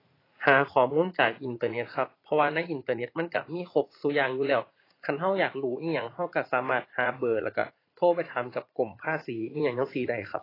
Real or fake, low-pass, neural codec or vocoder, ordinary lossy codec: fake; 5.4 kHz; vocoder, 44.1 kHz, 80 mel bands, Vocos; AAC, 24 kbps